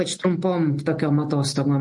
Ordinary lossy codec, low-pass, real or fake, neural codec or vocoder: MP3, 48 kbps; 10.8 kHz; real; none